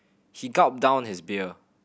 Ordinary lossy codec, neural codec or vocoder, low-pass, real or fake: none; none; none; real